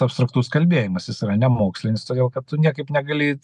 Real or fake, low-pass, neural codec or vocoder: real; 10.8 kHz; none